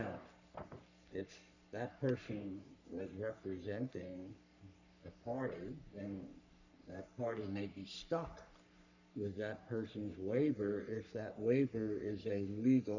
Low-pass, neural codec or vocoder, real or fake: 7.2 kHz; codec, 44.1 kHz, 3.4 kbps, Pupu-Codec; fake